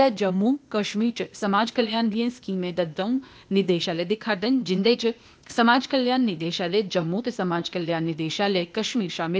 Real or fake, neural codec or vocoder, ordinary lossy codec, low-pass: fake; codec, 16 kHz, 0.8 kbps, ZipCodec; none; none